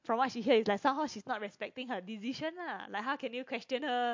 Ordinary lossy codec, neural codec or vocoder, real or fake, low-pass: MP3, 48 kbps; none; real; 7.2 kHz